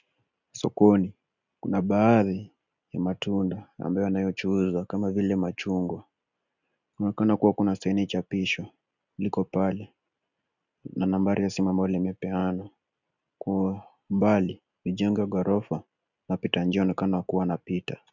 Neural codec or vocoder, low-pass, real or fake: none; 7.2 kHz; real